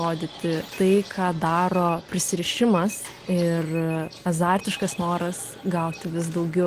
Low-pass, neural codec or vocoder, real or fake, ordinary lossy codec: 14.4 kHz; none; real; Opus, 24 kbps